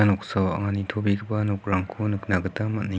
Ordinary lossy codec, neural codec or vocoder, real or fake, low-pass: none; none; real; none